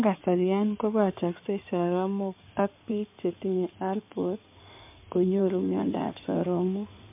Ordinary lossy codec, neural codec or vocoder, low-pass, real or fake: MP3, 32 kbps; codec, 16 kHz in and 24 kHz out, 2.2 kbps, FireRedTTS-2 codec; 3.6 kHz; fake